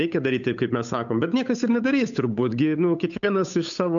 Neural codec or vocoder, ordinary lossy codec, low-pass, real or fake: codec, 16 kHz, 8 kbps, FunCodec, trained on Chinese and English, 25 frames a second; MP3, 96 kbps; 7.2 kHz; fake